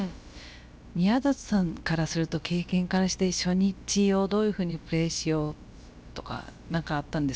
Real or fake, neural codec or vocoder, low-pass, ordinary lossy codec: fake; codec, 16 kHz, about 1 kbps, DyCAST, with the encoder's durations; none; none